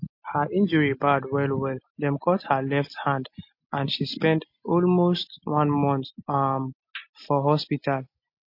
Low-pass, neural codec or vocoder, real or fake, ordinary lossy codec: 5.4 kHz; none; real; MP3, 32 kbps